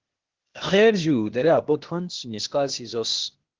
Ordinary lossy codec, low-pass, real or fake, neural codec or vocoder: Opus, 16 kbps; 7.2 kHz; fake; codec, 16 kHz, 0.8 kbps, ZipCodec